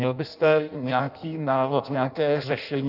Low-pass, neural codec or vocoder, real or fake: 5.4 kHz; codec, 16 kHz in and 24 kHz out, 0.6 kbps, FireRedTTS-2 codec; fake